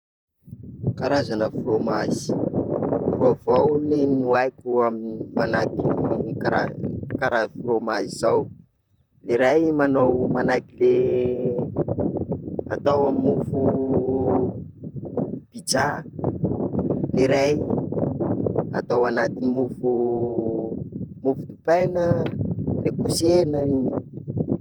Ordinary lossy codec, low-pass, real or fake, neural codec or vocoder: none; 19.8 kHz; fake; vocoder, 44.1 kHz, 128 mel bands, Pupu-Vocoder